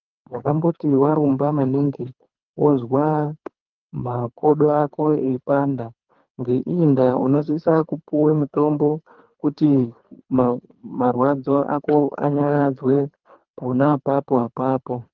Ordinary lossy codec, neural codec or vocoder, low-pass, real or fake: Opus, 32 kbps; codec, 24 kHz, 3 kbps, HILCodec; 7.2 kHz; fake